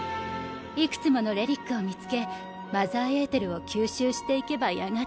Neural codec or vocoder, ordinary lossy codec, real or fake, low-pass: none; none; real; none